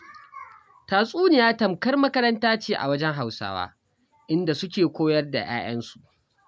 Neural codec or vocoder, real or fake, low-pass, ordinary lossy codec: none; real; none; none